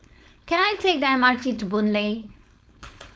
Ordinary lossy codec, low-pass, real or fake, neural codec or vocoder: none; none; fake; codec, 16 kHz, 4.8 kbps, FACodec